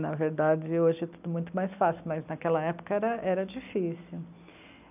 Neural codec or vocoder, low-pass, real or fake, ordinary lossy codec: none; 3.6 kHz; real; none